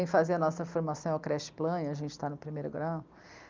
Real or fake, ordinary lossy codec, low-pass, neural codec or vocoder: real; Opus, 32 kbps; 7.2 kHz; none